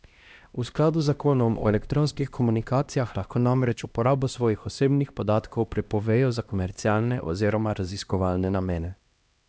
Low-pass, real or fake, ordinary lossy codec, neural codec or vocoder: none; fake; none; codec, 16 kHz, 1 kbps, X-Codec, HuBERT features, trained on LibriSpeech